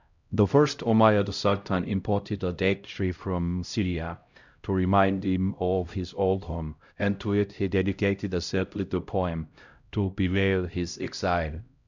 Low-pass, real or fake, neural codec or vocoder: 7.2 kHz; fake; codec, 16 kHz, 0.5 kbps, X-Codec, HuBERT features, trained on LibriSpeech